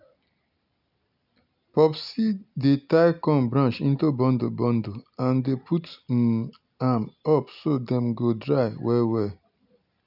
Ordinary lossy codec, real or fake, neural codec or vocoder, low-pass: none; real; none; 5.4 kHz